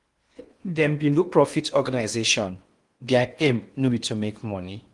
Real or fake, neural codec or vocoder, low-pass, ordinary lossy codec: fake; codec, 16 kHz in and 24 kHz out, 0.8 kbps, FocalCodec, streaming, 65536 codes; 10.8 kHz; Opus, 24 kbps